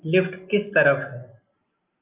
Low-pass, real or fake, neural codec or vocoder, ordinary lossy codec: 3.6 kHz; real; none; Opus, 24 kbps